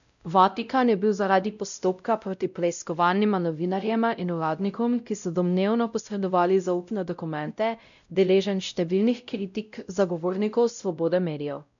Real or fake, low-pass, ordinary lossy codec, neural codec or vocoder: fake; 7.2 kHz; none; codec, 16 kHz, 0.5 kbps, X-Codec, WavLM features, trained on Multilingual LibriSpeech